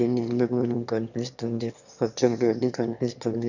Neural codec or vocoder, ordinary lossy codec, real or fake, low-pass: autoencoder, 22.05 kHz, a latent of 192 numbers a frame, VITS, trained on one speaker; none; fake; 7.2 kHz